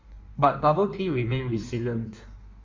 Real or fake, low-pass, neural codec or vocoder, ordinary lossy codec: fake; 7.2 kHz; codec, 16 kHz in and 24 kHz out, 1.1 kbps, FireRedTTS-2 codec; MP3, 64 kbps